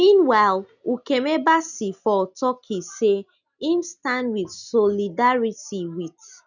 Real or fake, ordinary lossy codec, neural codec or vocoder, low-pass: real; none; none; 7.2 kHz